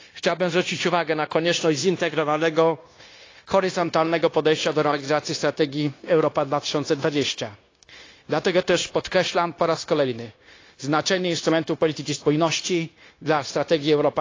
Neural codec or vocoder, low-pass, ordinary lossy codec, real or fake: codec, 16 kHz, 0.9 kbps, LongCat-Audio-Codec; 7.2 kHz; AAC, 32 kbps; fake